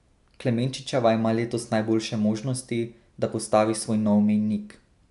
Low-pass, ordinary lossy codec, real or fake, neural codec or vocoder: 10.8 kHz; AAC, 96 kbps; real; none